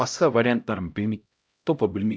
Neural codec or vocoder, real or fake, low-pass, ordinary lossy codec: codec, 16 kHz, 0.5 kbps, X-Codec, HuBERT features, trained on LibriSpeech; fake; none; none